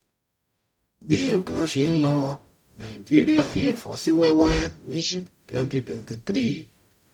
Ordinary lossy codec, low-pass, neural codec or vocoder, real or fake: none; 19.8 kHz; codec, 44.1 kHz, 0.9 kbps, DAC; fake